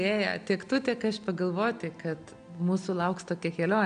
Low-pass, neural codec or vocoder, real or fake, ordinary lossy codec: 9.9 kHz; none; real; MP3, 96 kbps